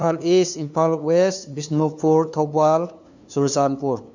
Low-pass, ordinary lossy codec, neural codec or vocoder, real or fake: 7.2 kHz; none; codec, 16 kHz, 2 kbps, FunCodec, trained on LibriTTS, 25 frames a second; fake